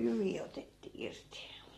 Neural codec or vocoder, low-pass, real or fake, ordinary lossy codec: none; 19.8 kHz; real; AAC, 32 kbps